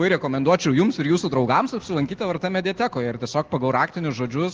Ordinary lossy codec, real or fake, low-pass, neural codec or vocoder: Opus, 16 kbps; real; 7.2 kHz; none